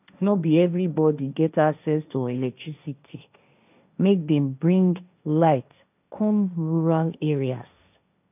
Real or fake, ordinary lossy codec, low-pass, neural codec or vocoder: fake; none; 3.6 kHz; codec, 16 kHz, 1.1 kbps, Voila-Tokenizer